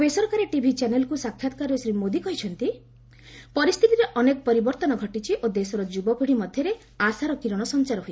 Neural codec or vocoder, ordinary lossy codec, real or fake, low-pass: none; none; real; none